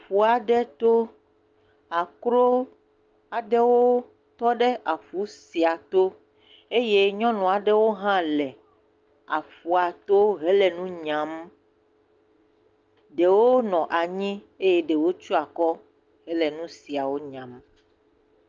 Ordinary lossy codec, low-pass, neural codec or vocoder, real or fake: Opus, 24 kbps; 7.2 kHz; none; real